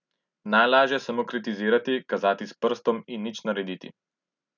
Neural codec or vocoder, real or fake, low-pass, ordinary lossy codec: none; real; 7.2 kHz; none